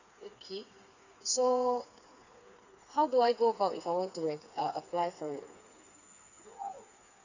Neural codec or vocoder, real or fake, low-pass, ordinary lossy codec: codec, 16 kHz, 4 kbps, FreqCodec, smaller model; fake; 7.2 kHz; none